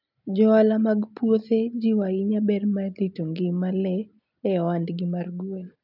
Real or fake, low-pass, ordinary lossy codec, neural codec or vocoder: real; 5.4 kHz; none; none